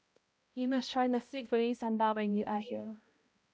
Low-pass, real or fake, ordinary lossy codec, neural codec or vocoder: none; fake; none; codec, 16 kHz, 0.5 kbps, X-Codec, HuBERT features, trained on balanced general audio